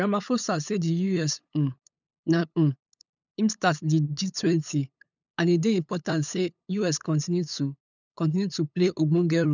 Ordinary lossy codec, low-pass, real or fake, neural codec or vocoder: none; 7.2 kHz; fake; codec, 16 kHz, 8 kbps, FunCodec, trained on LibriTTS, 25 frames a second